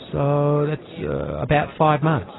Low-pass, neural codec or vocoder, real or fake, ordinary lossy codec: 7.2 kHz; none; real; AAC, 16 kbps